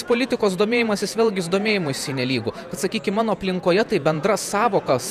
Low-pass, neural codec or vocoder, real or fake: 14.4 kHz; vocoder, 48 kHz, 128 mel bands, Vocos; fake